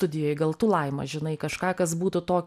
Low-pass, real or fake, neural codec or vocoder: 14.4 kHz; real; none